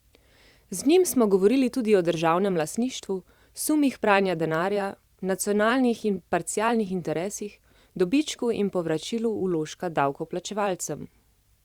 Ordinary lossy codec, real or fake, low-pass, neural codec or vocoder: Opus, 64 kbps; fake; 19.8 kHz; vocoder, 44.1 kHz, 128 mel bands every 512 samples, BigVGAN v2